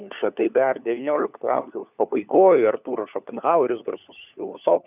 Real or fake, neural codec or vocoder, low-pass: fake; codec, 16 kHz, 4 kbps, FunCodec, trained on LibriTTS, 50 frames a second; 3.6 kHz